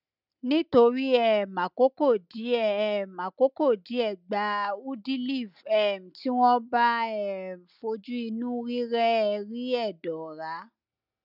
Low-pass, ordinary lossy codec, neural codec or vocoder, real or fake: 5.4 kHz; none; none; real